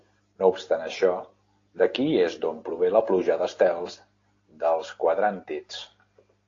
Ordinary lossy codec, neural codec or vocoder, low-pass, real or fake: AAC, 32 kbps; none; 7.2 kHz; real